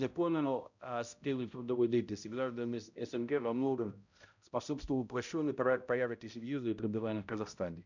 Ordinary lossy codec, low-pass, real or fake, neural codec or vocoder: none; 7.2 kHz; fake; codec, 16 kHz, 0.5 kbps, X-Codec, HuBERT features, trained on balanced general audio